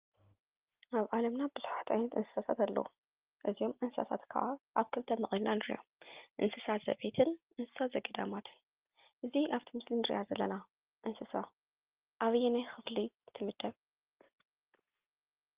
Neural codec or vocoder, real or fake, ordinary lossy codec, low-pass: none; real; Opus, 32 kbps; 3.6 kHz